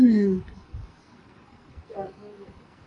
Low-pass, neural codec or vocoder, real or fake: 10.8 kHz; codec, 44.1 kHz, 2.6 kbps, SNAC; fake